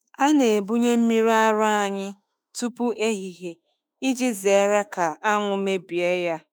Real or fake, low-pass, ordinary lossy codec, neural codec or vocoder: fake; none; none; autoencoder, 48 kHz, 32 numbers a frame, DAC-VAE, trained on Japanese speech